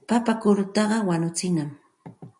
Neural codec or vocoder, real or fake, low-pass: none; real; 10.8 kHz